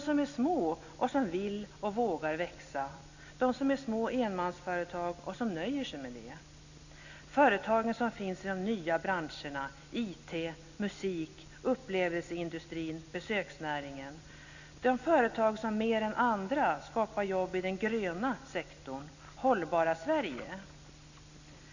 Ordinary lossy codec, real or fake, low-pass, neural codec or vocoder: none; real; 7.2 kHz; none